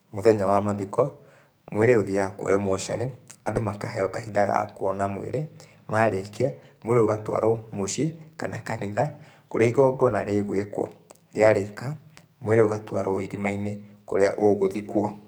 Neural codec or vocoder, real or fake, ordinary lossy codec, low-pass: codec, 44.1 kHz, 2.6 kbps, SNAC; fake; none; none